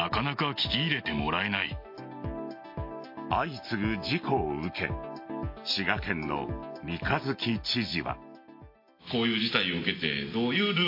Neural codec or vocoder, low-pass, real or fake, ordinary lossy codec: none; 5.4 kHz; real; MP3, 32 kbps